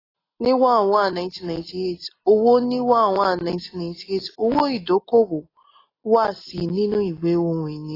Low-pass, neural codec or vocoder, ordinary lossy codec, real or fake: 5.4 kHz; none; AAC, 24 kbps; real